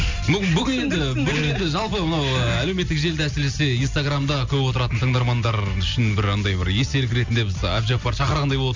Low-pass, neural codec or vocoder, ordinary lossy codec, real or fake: 7.2 kHz; none; MP3, 64 kbps; real